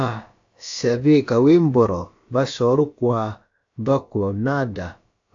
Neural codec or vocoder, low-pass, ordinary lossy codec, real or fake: codec, 16 kHz, about 1 kbps, DyCAST, with the encoder's durations; 7.2 kHz; AAC, 64 kbps; fake